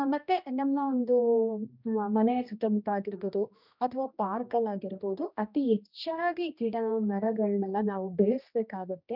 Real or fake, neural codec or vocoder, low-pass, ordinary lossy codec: fake; codec, 16 kHz, 1 kbps, X-Codec, HuBERT features, trained on general audio; 5.4 kHz; none